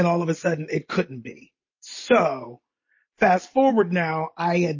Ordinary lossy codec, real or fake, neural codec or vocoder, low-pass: MP3, 32 kbps; real; none; 7.2 kHz